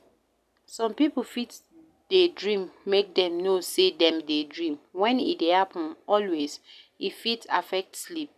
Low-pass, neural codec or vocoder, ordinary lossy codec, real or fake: 14.4 kHz; none; none; real